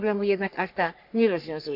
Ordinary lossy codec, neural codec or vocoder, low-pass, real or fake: none; codec, 44.1 kHz, 3.4 kbps, Pupu-Codec; 5.4 kHz; fake